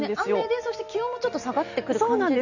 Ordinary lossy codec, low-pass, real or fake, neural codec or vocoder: none; 7.2 kHz; real; none